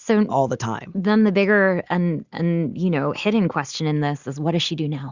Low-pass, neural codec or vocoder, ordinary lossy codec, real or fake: 7.2 kHz; none; Opus, 64 kbps; real